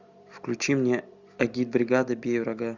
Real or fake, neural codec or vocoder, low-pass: real; none; 7.2 kHz